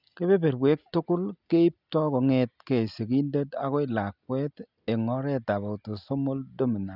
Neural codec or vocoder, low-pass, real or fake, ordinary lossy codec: none; 5.4 kHz; real; none